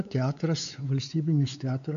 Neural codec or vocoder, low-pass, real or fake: codec, 16 kHz, 4 kbps, X-Codec, WavLM features, trained on Multilingual LibriSpeech; 7.2 kHz; fake